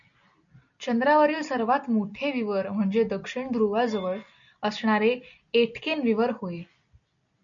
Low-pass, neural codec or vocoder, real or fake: 7.2 kHz; none; real